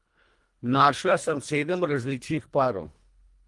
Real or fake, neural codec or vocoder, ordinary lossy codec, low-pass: fake; codec, 24 kHz, 1.5 kbps, HILCodec; Opus, 24 kbps; 10.8 kHz